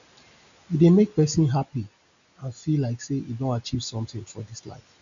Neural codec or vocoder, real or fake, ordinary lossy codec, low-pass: none; real; none; 7.2 kHz